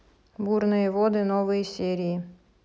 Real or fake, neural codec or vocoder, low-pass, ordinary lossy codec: real; none; none; none